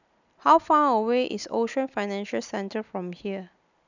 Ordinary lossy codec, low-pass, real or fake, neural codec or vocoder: none; 7.2 kHz; real; none